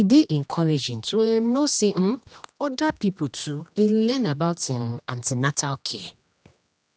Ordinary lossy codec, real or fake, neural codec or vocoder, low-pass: none; fake; codec, 16 kHz, 1 kbps, X-Codec, HuBERT features, trained on general audio; none